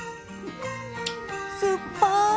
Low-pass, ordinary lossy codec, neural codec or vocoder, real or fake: none; none; none; real